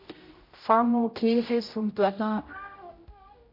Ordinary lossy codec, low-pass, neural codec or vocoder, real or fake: MP3, 32 kbps; 5.4 kHz; codec, 16 kHz, 0.5 kbps, X-Codec, HuBERT features, trained on general audio; fake